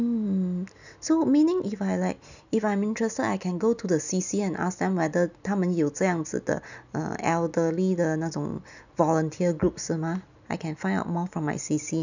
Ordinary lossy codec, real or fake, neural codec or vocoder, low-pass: none; real; none; 7.2 kHz